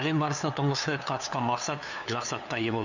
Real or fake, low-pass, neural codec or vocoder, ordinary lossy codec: fake; 7.2 kHz; codec, 16 kHz, 2 kbps, FunCodec, trained on LibriTTS, 25 frames a second; none